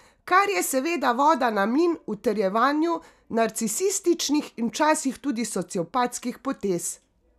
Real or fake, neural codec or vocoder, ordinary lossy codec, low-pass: real; none; none; 14.4 kHz